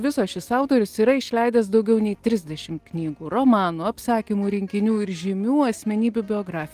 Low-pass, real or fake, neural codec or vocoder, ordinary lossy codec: 14.4 kHz; real; none; Opus, 24 kbps